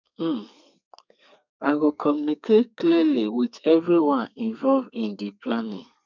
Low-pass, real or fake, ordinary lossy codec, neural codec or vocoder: 7.2 kHz; fake; none; codec, 32 kHz, 1.9 kbps, SNAC